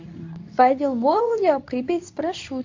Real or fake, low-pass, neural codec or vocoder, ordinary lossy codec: fake; 7.2 kHz; codec, 24 kHz, 0.9 kbps, WavTokenizer, medium speech release version 2; AAC, 48 kbps